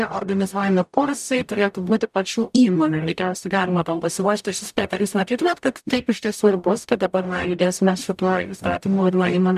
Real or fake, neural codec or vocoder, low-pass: fake; codec, 44.1 kHz, 0.9 kbps, DAC; 14.4 kHz